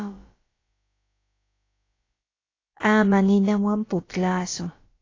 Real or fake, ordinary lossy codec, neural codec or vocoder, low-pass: fake; AAC, 32 kbps; codec, 16 kHz, about 1 kbps, DyCAST, with the encoder's durations; 7.2 kHz